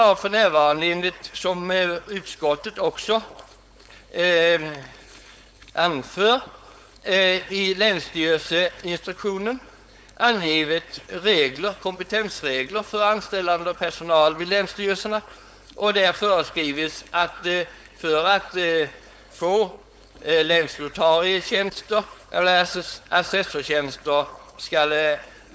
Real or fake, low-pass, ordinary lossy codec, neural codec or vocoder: fake; none; none; codec, 16 kHz, 4.8 kbps, FACodec